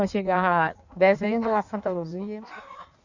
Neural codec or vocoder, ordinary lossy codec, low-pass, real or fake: codec, 16 kHz in and 24 kHz out, 1.1 kbps, FireRedTTS-2 codec; none; 7.2 kHz; fake